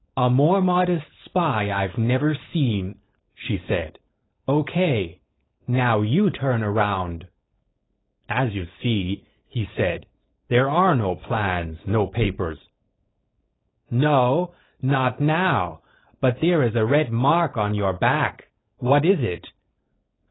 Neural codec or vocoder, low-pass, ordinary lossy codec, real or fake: codec, 16 kHz, 4.8 kbps, FACodec; 7.2 kHz; AAC, 16 kbps; fake